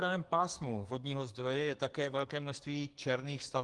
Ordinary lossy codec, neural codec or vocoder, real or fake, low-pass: Opus, 24 kbps; codec, 44.1 kHz, 2.6 kbps, SNAC; fake; 10.8 kHz